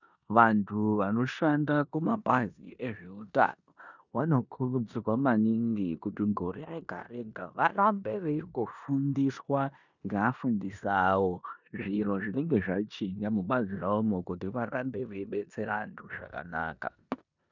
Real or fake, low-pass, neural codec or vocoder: fake; 7.2 kHz; codec, 16 kHz in and 24 kHz out, 0.9 kbps, LongCat-Audio-Codec, four codebook decoder